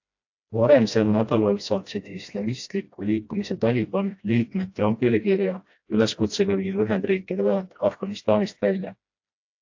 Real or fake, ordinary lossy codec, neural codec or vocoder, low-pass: fake; AAC, 48 kbps; codec, 16 kHz, 1 kbps, FreqCodec, smaller model; 7.2 kHz